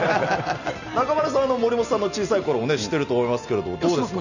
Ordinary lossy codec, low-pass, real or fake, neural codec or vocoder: none; 7.2 kHz; real; none